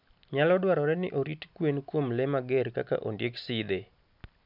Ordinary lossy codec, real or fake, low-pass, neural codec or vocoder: none; real; 5.4 kHz; none